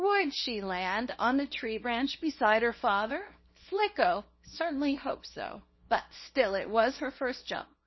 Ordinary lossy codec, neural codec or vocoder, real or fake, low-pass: MP3, 24 kbps; codec, 24 kHz, 0.9 kbps, WavTokenizer, small release; fake; 7.2 kHz